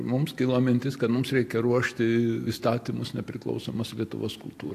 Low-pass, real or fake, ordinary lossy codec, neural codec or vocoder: 14.4 kHz; real; AAC, 64 kbps; none